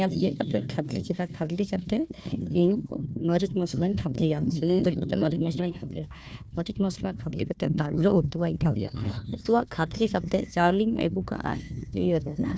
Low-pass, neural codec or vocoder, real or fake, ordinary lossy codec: none; codec, 16 kHz, 1 kbps, FunCodec, trained on Chinese and English, 50 frames a second; fake; none